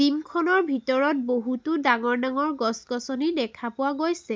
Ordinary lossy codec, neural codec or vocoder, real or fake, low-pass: none; none; real; none